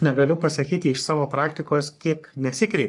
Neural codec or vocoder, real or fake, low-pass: codec, 44.1 kHz, 3.4 kbps, Pupu-Codec; fake; 10.8 kHz